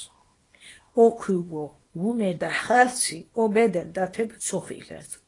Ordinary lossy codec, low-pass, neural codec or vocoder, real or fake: AAC, 32 kbps; 10.8 kHz; codec, 24 kHz, 0.9 kbps, WavTokenizer, small release; fake